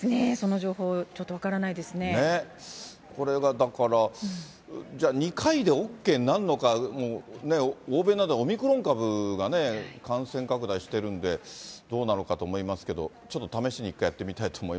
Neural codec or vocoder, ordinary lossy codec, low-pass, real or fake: none; none; none; real